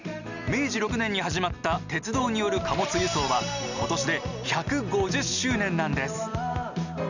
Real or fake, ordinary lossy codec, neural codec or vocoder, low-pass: real; none; none; 7.2 kHz